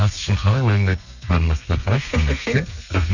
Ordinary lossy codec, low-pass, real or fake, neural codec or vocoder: none; 7.2 kHz; fake; codec, 32 kHz, 1.9 kbps, SNAC